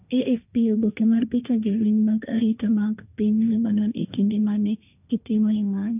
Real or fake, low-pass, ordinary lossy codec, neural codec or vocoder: fake; 3.6 kHz; none; codec, 16 kHz, 1.1 kbps, Voila-Tokenizer